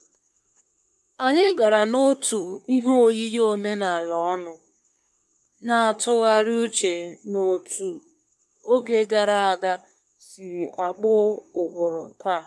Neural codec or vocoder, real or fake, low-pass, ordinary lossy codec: codec, 24 kHz, 1 kbps, SNAC; fake; none; none